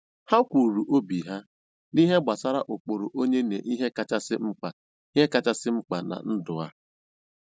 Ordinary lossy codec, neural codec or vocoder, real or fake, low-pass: none; none; real; none